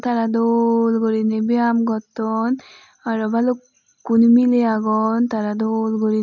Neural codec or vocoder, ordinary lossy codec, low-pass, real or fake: none; none; 7.2 kHz; real